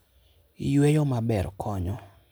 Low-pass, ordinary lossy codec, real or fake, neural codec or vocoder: none; none; real; none